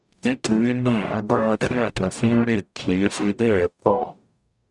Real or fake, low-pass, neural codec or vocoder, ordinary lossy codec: fake; 10.8 kHz; codec, 44.1 kHz, 0.9 kbps, DAC; none